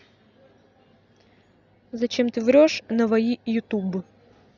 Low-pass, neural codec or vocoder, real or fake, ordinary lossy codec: 7.2 kHz; none; real; none